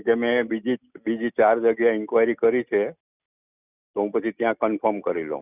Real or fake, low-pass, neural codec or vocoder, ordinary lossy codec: real; 3.6 kHz; none; none